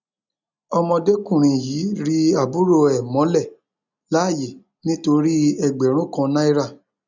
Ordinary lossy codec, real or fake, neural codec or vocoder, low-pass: none; real; none; 7.2 kHz